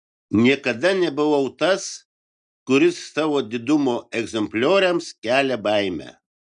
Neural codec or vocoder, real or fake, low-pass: none; real; 9.9 kHz